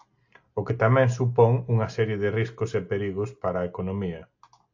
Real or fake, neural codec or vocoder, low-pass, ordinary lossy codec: real; none; 7.2 kHz; AAC, 48 kbps